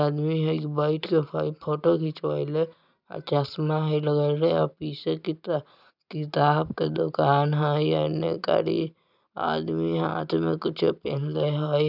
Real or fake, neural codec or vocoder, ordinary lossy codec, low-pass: real; none; none; 5.4 kHz